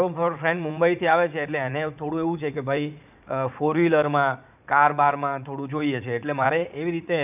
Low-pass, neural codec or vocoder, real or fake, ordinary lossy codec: 3.6 kHz; vocoder, 44.1 kHz, 80 mel bands, Vocos; fake; none